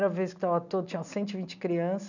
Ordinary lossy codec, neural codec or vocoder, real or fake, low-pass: none; none; real; 7.2 kHz